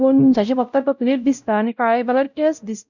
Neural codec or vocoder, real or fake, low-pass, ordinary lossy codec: codec, 16 kHz, 0.5 kbps, X-Codec, WavLM features, trained on Multilingual LibriSpeech; fake; 7.2 kHz; none